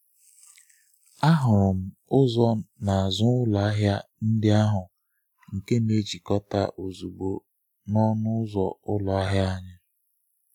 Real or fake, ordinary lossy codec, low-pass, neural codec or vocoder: real; none; 19.8 kHz; none